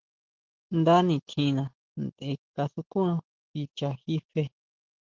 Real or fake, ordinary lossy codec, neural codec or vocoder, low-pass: real; Opus, 16 kbps; none; 7.2 kHz